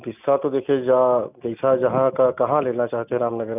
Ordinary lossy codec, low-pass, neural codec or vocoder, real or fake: none; 3.6 kHz; none; real